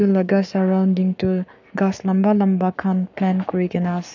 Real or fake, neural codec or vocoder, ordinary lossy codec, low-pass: fake; codec, 44.1 kHz, 7.8 kbps, Pupu-Codec; none; 7.2 kHz